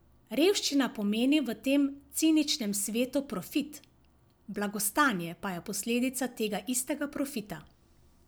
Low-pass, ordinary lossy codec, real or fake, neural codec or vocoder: none; none; real; none